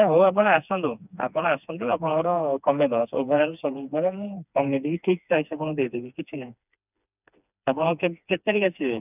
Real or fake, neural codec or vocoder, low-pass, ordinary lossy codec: fake; codec, 16 kHz, 2 kbps, FreqCodec, smaller model; 3.6 kHz; none